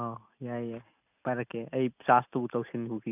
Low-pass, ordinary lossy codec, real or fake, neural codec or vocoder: 3.6 kHz; none; real; none